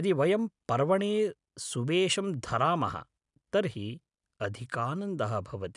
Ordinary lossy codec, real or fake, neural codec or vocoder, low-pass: none; real; none; 10.8 kHz